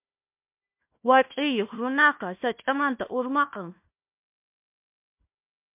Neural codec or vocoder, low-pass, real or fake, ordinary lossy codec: codec, 16 kHz, 1 kbps, FunCodec, trained on Chinese and English, 50 frames a second; 3.6 kHz; fake; MP3, 32 kbps